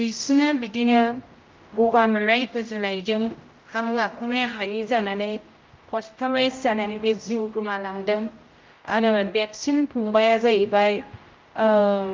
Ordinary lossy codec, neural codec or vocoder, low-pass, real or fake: Opus, 24 kbps; codec, 16 kHz, 0.5 kbps, X-Codec, HuBERT features, trained on general audio; 7.2 kHz; fake